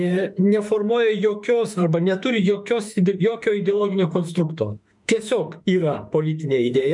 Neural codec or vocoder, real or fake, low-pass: autoencoder, 48 kHz, 32 numbers a frame, DAC-VAE, trained on Japanese speech; fake; 10.8 kHz